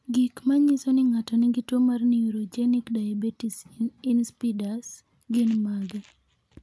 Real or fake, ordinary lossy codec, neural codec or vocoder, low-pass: real; none; none; none